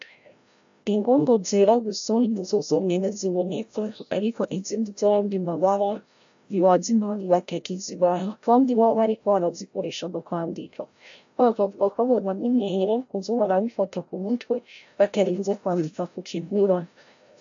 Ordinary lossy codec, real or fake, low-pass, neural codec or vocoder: AAC, 64 kbps; fake; 7.2 kHz; codec, 16 kHz, 0.5 kbps, FreqCodec, larger model